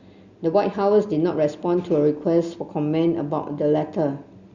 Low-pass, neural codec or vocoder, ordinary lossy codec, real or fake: 7.2 kHz; none; Opus, 64 kbps; real